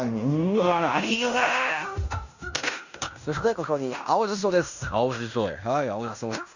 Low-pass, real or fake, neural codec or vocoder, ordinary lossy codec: 7.2 kHz; fake; codec, 16 kHz in and 24 kHz out, 0.9 kbps, LongCat-Audio-Codec, fine tuned four codebook decoder; none